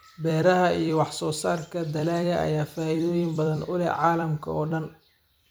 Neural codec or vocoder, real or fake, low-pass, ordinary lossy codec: vocoder, 44.1 kHz, 128 mel bands every 256 samples, BigVGAN v2; fake; none; none